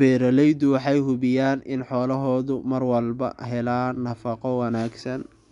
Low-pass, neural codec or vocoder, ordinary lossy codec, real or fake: 10.8 kHz; none; none; real